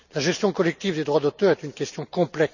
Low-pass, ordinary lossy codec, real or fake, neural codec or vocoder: 7.2 kHz; none; real; none